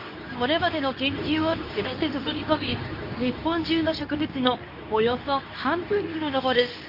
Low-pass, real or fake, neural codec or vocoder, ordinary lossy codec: 5.4 kHz; fake; codec, 24 kHz, 0.9 kbps, WavTokenizer, medium speech release version 2; AAC, 48 kbps